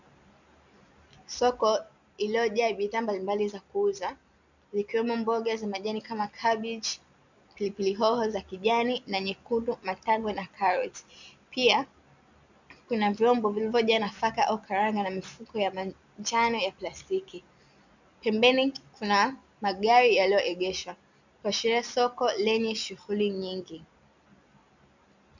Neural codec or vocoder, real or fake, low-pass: none; real; 7.2 kHz